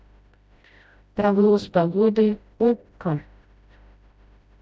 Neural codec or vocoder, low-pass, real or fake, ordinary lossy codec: codec, 16 kHz, 0.5 kbps, FreqCodec, smaller model; none; fake; none